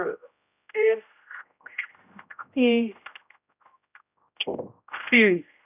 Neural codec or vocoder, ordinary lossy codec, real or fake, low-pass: codec, 16 kHz, 0.5 kbps, X-Codec, HuBERT features, trained on general audio; none; fake; 3.6 kHz